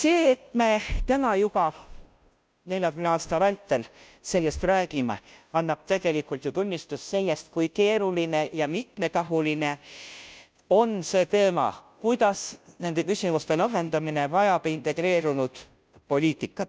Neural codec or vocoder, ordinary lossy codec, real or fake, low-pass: codec, 16 kHz, 0.5 kbps, FunCodec, trained on Chinese and English, 25 frames a second; none; fake; none